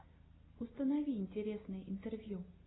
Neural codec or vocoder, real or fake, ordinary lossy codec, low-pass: none; real; AAC, 16 kbps; 7.2 kHz